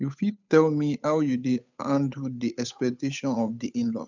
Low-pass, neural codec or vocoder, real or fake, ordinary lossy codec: 7.2 kHz; codec, 16 kHz, 8 kbps, FunCodec, trained on Chinese and English, 25 frames a second; fake; none